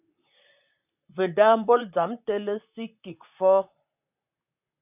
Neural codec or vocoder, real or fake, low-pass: vocoder, 44.1 kHz, 128 mel bands, Pupu-Vocoder; fake; 3.6 kHz